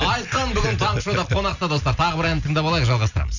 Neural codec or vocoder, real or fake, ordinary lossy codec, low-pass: none; real; none; 7.2 kHz